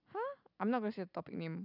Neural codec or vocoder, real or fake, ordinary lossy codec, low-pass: none; real; none; 5.4 kHz